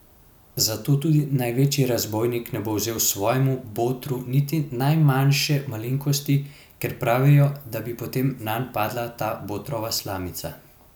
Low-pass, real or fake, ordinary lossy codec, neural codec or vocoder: 19.8 kHz; real; none; none